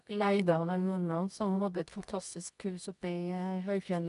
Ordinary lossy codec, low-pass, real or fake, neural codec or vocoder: none; 10.8 kHz; fake; codec, 24 kHz, 0.9 kbps, WavTokenizer, medium music audio release